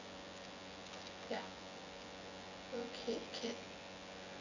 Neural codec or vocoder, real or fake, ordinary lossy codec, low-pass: vocoder, 24 kHz, 100 mel bands, Vocos; fake; none; 7.2 kHz